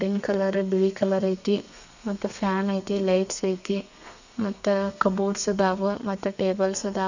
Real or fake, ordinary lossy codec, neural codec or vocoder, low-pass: fake; none; codec, 32 kHz, 1.9 kbps, SNAC; 7.2 kHz